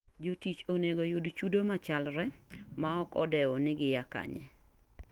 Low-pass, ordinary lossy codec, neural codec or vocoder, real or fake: 19.8 kHz; Opus, 24 kbps; autoencoder, 48 kHz, 128 numbers a frame, DAC-VAE, trained on Japanese speech; fake